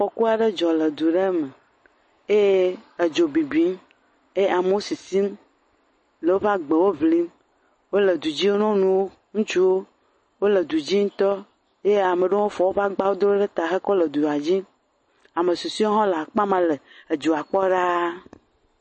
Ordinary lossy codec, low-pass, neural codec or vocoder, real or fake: MP3, 32 kbps; 9.9 kHz; none; real